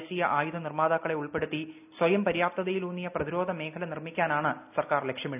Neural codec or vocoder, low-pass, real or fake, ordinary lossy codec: none; 3.6 kHz; real; none